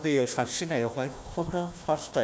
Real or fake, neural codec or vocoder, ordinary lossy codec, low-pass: fake; codec, 16 kHz, 1 kbps, FunCodec, trained on Chinese and English, 50 frames a second; none; none